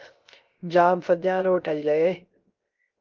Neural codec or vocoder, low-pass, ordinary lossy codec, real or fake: codec, 16 kHz, 0.3 kbps, FocalCodec; 7.2 kHz; Opus, 32 kbps; fake